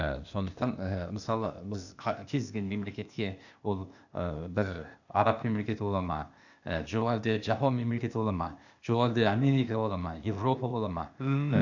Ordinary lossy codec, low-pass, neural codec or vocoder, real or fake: none; 7.2 kHz; codec, 16 kHz, 0.8 kbps, ZipCodec; fake